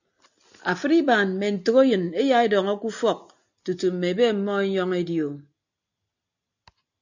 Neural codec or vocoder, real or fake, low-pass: none; real; 7.2 kHz